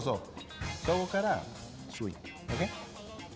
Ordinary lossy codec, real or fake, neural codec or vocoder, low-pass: none; real; none; none